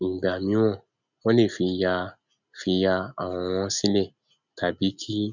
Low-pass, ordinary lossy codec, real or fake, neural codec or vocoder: 7.2 kHz; none; real; none